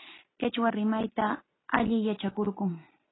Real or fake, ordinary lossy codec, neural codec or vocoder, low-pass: real; AAC, 16 kbps; none; 7.2 kHz